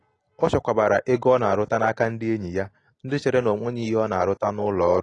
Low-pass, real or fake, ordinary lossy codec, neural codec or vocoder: 10.8 kHz; real; AAC, 32 kbps; none